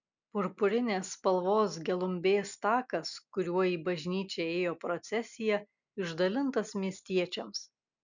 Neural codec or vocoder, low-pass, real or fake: none; 7.2 kHz; real